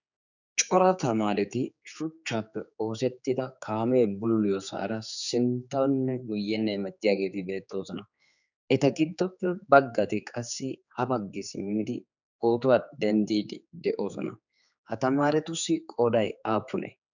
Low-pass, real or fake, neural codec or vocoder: 7.2 kHz; fake; codec, 16 kHz, 4 kbps, X-Codec, HuBERT features, trained on general audio